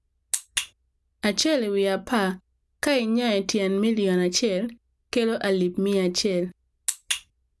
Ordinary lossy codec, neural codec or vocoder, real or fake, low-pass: none; none; real; none